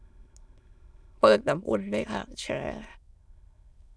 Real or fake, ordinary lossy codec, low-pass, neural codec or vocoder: fake; none; none; autoencoder, 22.05 kHz, a latent of 192 numbers a frame, VITS, trained on many speakers